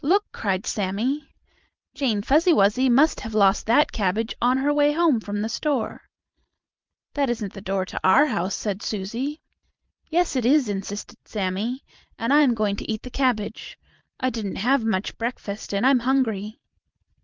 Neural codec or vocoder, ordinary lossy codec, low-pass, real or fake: vocoder, 44.1 kHz, 128 mel bands every 512 samples, BigVGAN v2; Opus, 24 kbps; 7.2 kHz; fake